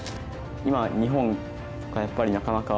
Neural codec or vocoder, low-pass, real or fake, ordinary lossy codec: none; none; real; none